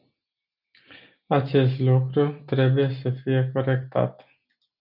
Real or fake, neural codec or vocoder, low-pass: real; none; 5.4 kHz